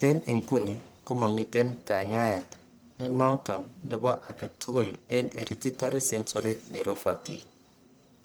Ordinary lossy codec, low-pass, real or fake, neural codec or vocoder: none; none; fake; codec, 44.1 kHz, 1.7 kbps, Pupu-Codec